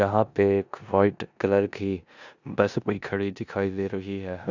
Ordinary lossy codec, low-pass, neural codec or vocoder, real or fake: none; 7.2 kHz; codec, 16 kHz in and 24 kHz out, 0.9 kbps, LongCat-Audio-Codec, four codebook decoder; fake